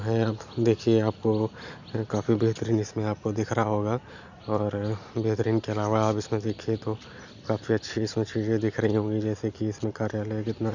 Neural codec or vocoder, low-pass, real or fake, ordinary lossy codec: none; 7.2 kHz; real; none